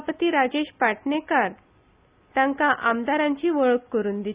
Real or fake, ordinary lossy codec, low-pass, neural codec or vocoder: real; Opus, 64 kbps; 3.6 kHz; none